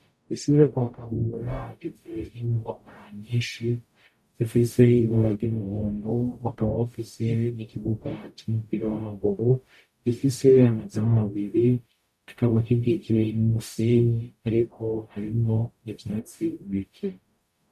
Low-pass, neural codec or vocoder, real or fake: 14.4 kHz; codec, 44.1 kHz, 0.9 kbps, DAC; fake